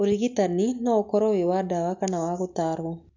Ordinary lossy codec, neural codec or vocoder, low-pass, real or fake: none; none; 7.2 kHz; real